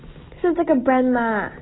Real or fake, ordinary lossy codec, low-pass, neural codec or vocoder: real; AAC, 16 kbps; 7.2 kHz; none